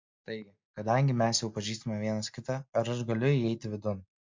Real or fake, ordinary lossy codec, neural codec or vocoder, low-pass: real; MP3, 48 kbps; none; 7.2 kHz